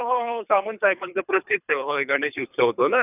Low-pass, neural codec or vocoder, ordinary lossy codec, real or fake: 3.6 kHz; codec, 24 kHz, 3 kbps, HILCodec; none; fake